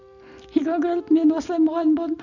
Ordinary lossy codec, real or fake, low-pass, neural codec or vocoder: none; real; 7.2 kHz; none